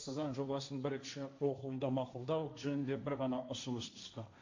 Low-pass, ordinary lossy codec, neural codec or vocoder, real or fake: 7.2 kHz; MP3, 48 kbps; codec, 16 kHz, 1.1 kbps, Voila-Tokenizer; fake